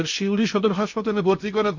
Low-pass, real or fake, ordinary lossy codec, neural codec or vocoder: 7.2 kHz; fake; none; codec, 16 kHz in and 24 kHz out, 0.8 kbps, FocalCodec, streaming, 65536 codes